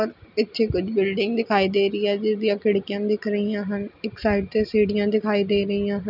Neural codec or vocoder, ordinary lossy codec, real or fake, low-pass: none; none; real; 5.4 kHz